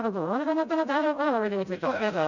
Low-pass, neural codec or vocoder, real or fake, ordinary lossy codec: 7.2 kHz; codec, 16 kHz, 0.5 kbps, FreqCodec, smaller model; fake; none